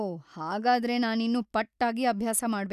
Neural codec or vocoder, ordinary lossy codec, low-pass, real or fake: none; none; 14.4 kHz; real